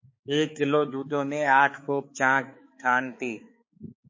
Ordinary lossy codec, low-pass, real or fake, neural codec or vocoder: MP3, 32 kbps; 7.2 kHz; fake; codec, 16 kHz, 4 kbps, X-Codec, HuBERT features, trained on balanced general audio